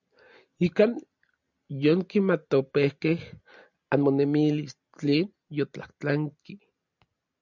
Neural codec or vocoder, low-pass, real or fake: none; 7.2 kHz; real